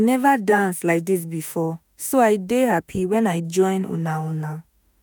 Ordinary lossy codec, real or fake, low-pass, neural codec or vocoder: none; fake; none; autoencoder, 48 kHz, 32 numbers a frame, DAC-VAE, trained on Japanese speech